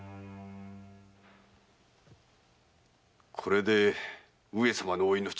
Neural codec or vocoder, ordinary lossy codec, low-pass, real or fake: none; none; none; real